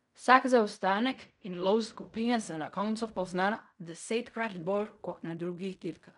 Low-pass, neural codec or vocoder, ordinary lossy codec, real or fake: 10.8 kHz; codec, 16 kHz in and 24 kHz out, 0.4 kbps, LongCat-Audio-Codec, fine tuned four codebook decoder; none; fake